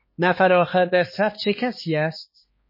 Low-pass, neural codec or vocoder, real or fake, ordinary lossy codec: 5.4 kHz; codec, 16 kHz, 2 kbps, X-Codec, HuBERT features, trained on balanced general audio; fake; MP3, 24 kbps